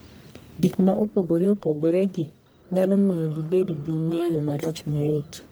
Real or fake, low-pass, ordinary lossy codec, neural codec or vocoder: fake; none; none; codec, 44.1 kHz, 1.7 kbps, Pupu-Codec